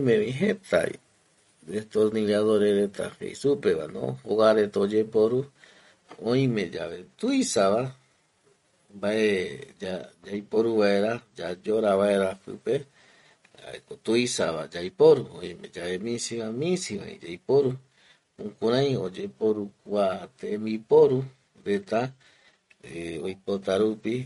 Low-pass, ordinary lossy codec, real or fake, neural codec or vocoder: 19.8 kHz; MP3, 48 kbps; real; none